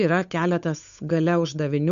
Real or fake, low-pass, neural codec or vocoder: fake; 7.2 kHz; codec, 16 kHz, 8 kbps, FunCodec, trained on Chinese and English, 25 frames a second